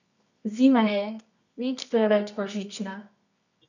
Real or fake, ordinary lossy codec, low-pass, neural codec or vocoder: fake; none; 7.2 kHz; codec, 24 kHz, 0.9 kbps, WavTokenizer, medium music audio release